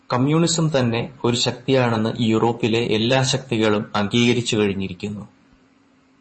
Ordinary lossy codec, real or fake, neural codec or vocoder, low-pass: MP3, 32 kbps; fake; vocoder, 24 kHz, 100 mel bands, Vocos; 10.8 kHz